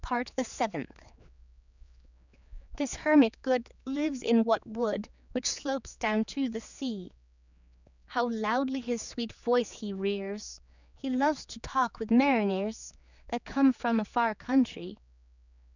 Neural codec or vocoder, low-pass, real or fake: codec, 16 kHz, 4 kbps, X-Codec, HuBERT features, trained on general audio; 7.2 kHz; fake